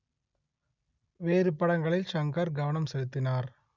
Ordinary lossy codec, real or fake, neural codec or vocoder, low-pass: none; real; none; 7.2 kHz